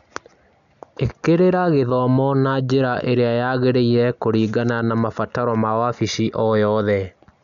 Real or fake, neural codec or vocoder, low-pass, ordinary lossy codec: real; none; 7.2 kHz; none